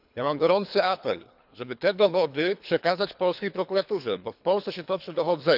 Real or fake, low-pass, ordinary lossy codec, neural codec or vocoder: fake; 5.4 kHz; none; codec, 24 kHz, 3 kbps, HILCodec